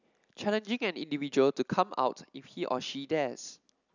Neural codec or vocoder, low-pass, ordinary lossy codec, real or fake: none; 7.2 kHz; none; real